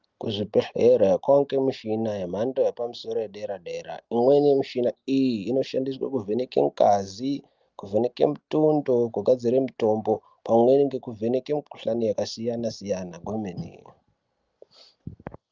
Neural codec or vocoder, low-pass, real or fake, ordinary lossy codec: none; 7.2 kHz; real; Opus, 24 kbps